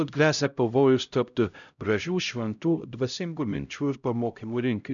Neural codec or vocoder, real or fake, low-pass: codec, 16 kHz, 0.5 kbps, X-Codec, HuBERT features, trained on LibriSpeech; fake; 7.2 kHz